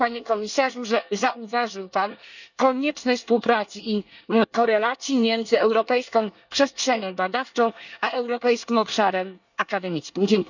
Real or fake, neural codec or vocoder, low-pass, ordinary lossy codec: fake; codec, 24 kHz, 1 kbps, SNAC; 7.2 kHz; none